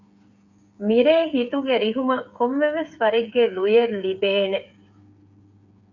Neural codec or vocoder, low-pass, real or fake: codec, 16 kHz, 8 kbps, FreqCodec, smaller model; 7.2 kHz; fake